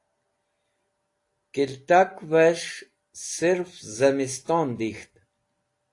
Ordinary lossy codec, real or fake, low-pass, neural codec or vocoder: AAC, 48 kbps; real; 10.8 kHz; none